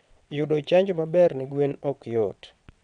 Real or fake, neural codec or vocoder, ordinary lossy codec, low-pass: fake; vocoder, 22.05 kHz, 80 mel bands, WaveNeXt; none; 9.9 kHz